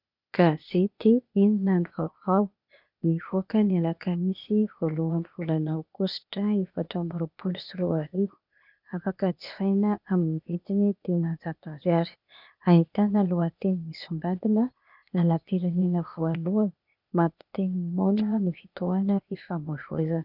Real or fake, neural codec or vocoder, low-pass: fake; codec, 16 kHz, 0.8 kbps, ZipCodec; 5.4 kHz